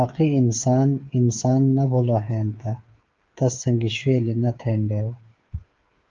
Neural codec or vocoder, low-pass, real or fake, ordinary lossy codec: codec, 16 kHz, 8 kbps, FreqCodec, smaller model; 7.2 kHz; fake; Opus, 32 kbps